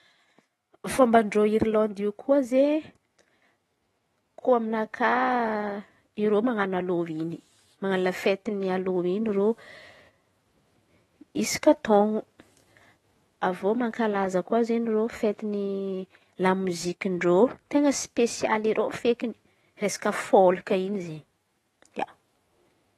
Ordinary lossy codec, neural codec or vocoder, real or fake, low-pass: AAC, 32 kbps; autoencoder, 48 kHz, 128 numbers a frame, DAC-VAE, trained on Japanese speech; fake; 19.8 kHz